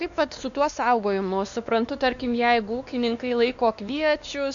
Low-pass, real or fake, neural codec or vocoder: 7.2 kHz; fake; codec, 16 kHz, 2 kbps, X-Codec, WavLM features, trained on Multilingual LibriSpeech